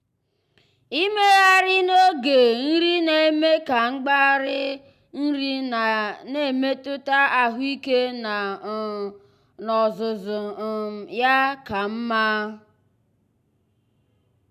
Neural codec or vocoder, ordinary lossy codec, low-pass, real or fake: none; none; 14.4 kHz; real